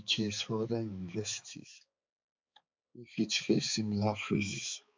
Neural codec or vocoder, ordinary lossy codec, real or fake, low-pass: codec, 16 kHz, 4 kbps, X-Codec, HuBERT features, trained on balanced general audio; MP3, 64 kbps; fake; 7.2 kHz